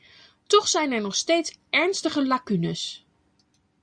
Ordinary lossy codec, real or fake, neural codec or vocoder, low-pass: Opus, 64 kbps; real; none; 9.9 kHz